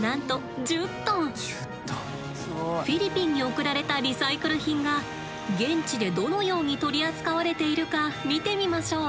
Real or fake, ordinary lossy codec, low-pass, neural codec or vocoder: real; none; none; none